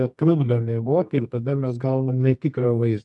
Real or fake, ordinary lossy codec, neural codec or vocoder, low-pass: fake; MP3, 96 kbps; codec, 24 kHz, 0.9 kbps, WavTokenizer, medium music audio release; 10.8 kHz